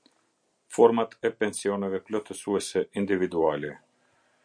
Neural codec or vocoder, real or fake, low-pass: none; real; 9.9 kHz